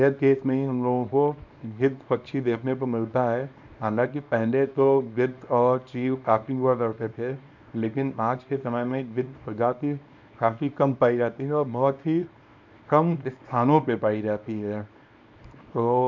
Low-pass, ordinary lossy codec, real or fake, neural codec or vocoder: 7.2 kHz; none; fake; codec, 24 kHz, 0.9 kbps, WavTokenizer, small release